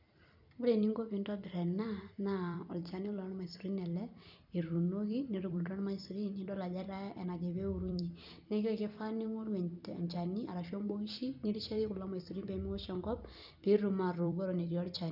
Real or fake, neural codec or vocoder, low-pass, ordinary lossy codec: fake; vocoder, 44.1 kHz, 128 mel bands every 256 samples, BigVGAN v2; 5.4 kHz; none